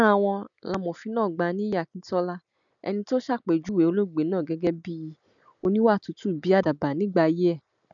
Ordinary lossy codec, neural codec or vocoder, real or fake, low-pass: none; none; real; 7.2 kHz